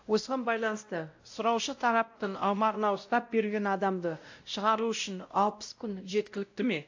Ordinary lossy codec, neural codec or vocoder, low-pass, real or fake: AAC, 48 kbps; codec, 16 kHz, 0.5 kbps, X-Codec, WavLM features, trained on Multilingual LibriSpeech; 7.2 kHz; fake